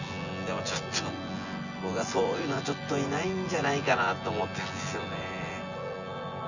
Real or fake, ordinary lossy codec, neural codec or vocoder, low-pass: fake; none; vocoder, 24 kHz, 100 mel bands, Vocos; 7.2 kHz